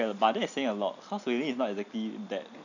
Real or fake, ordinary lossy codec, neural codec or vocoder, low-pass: real; none; none; 7.2 kHz